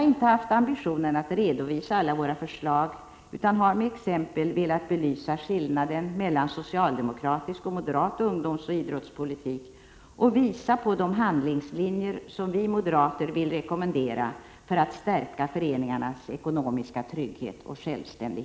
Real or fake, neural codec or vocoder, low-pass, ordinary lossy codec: real; none; none; none